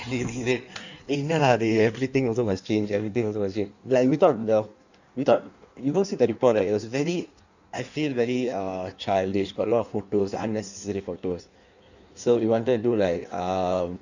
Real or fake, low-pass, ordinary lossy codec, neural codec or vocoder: fake; 7.2 kHz; none; codec, 16 kHz in and 24 kHz out, 1.1 kbps, FireRedTTS-2 codec